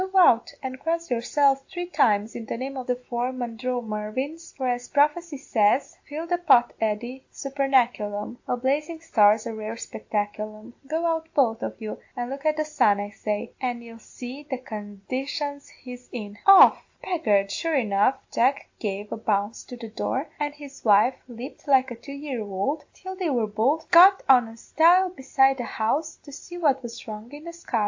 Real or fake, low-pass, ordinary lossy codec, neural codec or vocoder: real; 7.2 kHz; AAC, 48 kbps; none